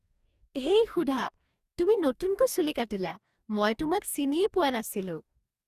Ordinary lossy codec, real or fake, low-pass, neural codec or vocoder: Opus, 64 kbps; fake; 14.4 kHz; codec, 44.1 kHz, 2.6 kbps, DAC